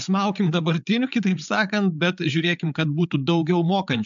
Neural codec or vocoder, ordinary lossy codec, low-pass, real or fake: codec, 16 kHz, 8 kbps, FreqCodec, larger model; MP3, 64 kbps; 7.2 kHz; fake